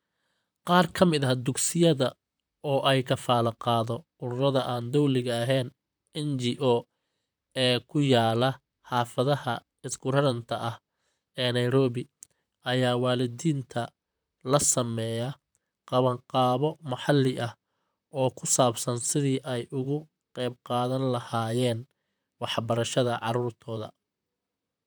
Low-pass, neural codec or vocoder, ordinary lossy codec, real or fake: none; vocoder, 44.1 kHz, 128 mel bands, Pupu-Vocoder; none; fake